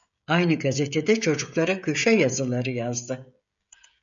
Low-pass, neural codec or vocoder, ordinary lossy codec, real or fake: 7.2 kHz; codec, 16 kHz, 16 kbps, FreqCodec, smaller model; MP3, 64 kbps; fake